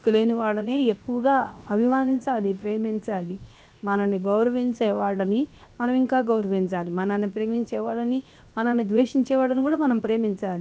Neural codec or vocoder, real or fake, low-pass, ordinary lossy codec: codec, 16 kHz, 0.7 kbps, FocalCodec; fake; none; none